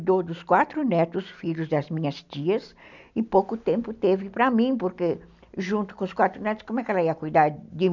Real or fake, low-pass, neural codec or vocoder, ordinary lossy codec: real; 7.2 kHz; none; none